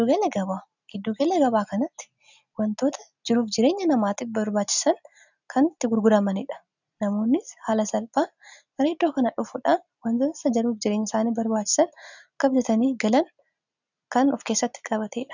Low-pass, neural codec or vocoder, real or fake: 7.2 kHz; none; real